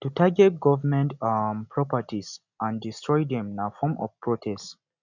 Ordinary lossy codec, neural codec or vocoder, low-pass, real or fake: none; none; 7.2 kHz; real